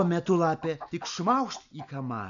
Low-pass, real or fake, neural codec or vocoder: 7.2 kHz; real; none